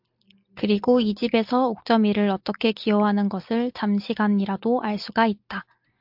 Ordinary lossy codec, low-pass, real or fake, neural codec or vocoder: MP3, 48 kbps; 5.4 kHz; real; none